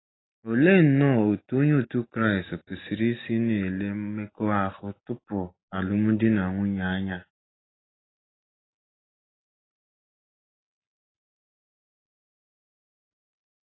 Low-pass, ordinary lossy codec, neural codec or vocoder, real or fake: 7.2 kHz; AAC, 16 kbps; none; real